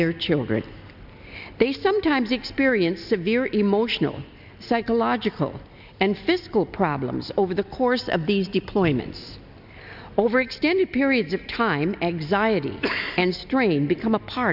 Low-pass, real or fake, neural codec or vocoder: 5.4 kHz; real; none